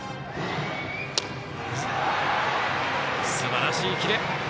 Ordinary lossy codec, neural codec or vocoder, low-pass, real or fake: none; none; none; real